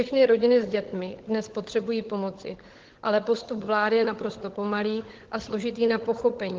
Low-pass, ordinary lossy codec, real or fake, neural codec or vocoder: 7.2 kHz; Opus, 16 kbps; fake; codec, 16 kHz, 16 kbps, FunCodec, trained on LibriTTS, 50 frames a second